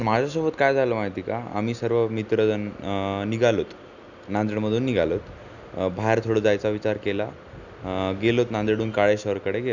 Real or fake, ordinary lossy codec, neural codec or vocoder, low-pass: real; none; none; 7.2 kHz